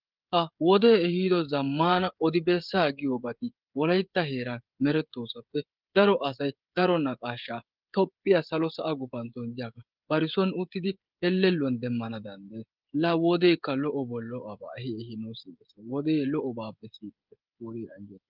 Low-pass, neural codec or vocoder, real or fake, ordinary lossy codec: 5.4 kHz; codec, 16 kHz, 16 kbps, FreqCodec, smaller model; fake; Opus, 32 kbps